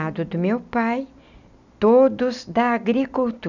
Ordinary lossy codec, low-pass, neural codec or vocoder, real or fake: none; 7.2 kHz; none; real